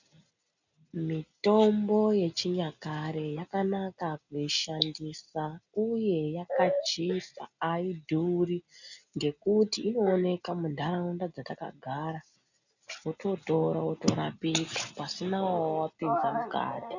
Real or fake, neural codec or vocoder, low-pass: real; none; 7.2 kHz